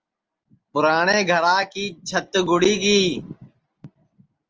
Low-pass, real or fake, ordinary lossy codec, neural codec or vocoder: 7.2 kHz; real; Opus, 24 kbps; none